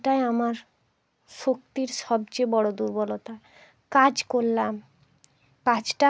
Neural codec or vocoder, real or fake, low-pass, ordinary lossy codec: none; real; none; none